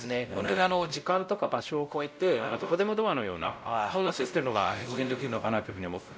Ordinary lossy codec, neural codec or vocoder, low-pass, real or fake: none; codec, 16 kHz, 0.5 kbps, X-Codec, WavLM features, trained on Multilingual LibriSpeech; none; fake